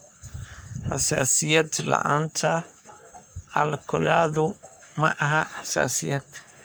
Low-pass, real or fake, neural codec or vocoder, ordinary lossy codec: none; fake; codec, 44.1 kHz, 3.4 kbps, Pupu-Codec; none